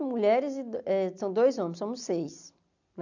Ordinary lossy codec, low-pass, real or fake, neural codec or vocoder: none; 7.2 kHz; real; none